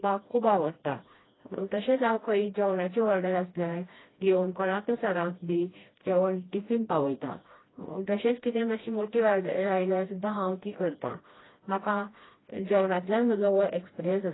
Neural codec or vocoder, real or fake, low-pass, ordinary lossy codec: codec, 16 kHz, 1 kbps, FreqCodec, smaller model; fake; 7.2 kHz; AAC, 16 kbps